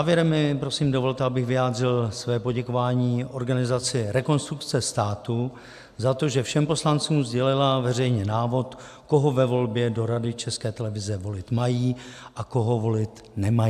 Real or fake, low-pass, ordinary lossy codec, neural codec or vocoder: real; 14.4 kHz; AAC, 96 kbps; none